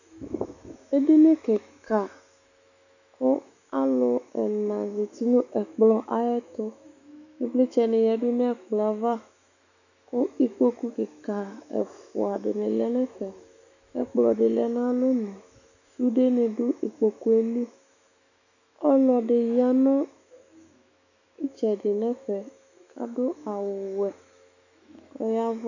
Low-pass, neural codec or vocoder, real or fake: 7.2 kHz; autoencoder, 48 kHz, 128 numbers a frame, DAC-VAE, trained on Japanese speech; fake